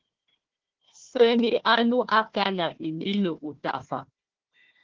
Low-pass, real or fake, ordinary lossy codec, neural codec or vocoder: 7.2 kHz; fake; Opus, 16 kbps; codec, 16 kHz, 1 kbps, FunCodec, trained on Chinese and English, 50 frames a second